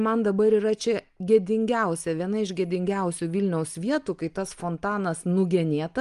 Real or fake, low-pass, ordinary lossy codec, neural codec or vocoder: real; 10.8 kHz; Opus, 32 kbps; none